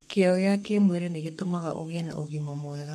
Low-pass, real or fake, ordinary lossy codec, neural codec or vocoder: 14.4 kHz; fake; MP3, 64 kbps; codec, 32 kHz, 1.9 kbps, SNAC